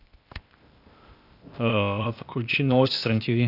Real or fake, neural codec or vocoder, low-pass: fake; codec, 16 kHz, 0.8 kbps, ZipCodec; 5.4 kHz